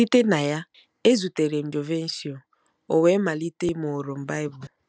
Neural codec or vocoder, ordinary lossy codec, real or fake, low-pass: none; none; real; none